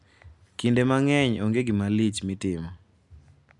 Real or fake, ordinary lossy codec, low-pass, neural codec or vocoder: real; none; 10.8 kHz; none